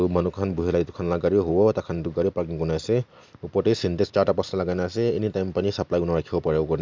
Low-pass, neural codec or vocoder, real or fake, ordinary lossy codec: 7.2 kHz; none; real; MP3, 64 kbps